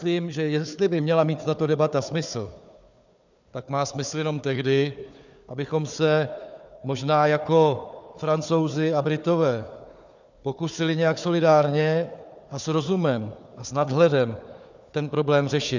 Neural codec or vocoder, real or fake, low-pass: codec, 16 kHz, 4 kbps, FunCodec, trained on Chinese and English, 50 frames a second; fake; 7.2 kHz